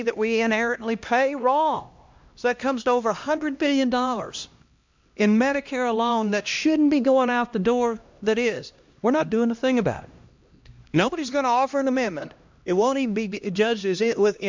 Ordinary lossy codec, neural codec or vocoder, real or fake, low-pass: MP3, 64 kbps; codec, 16 kHz, 1 kbps, X-Codec, HuBERT features, trained on LibriSpeech; fake; 7.2 kHz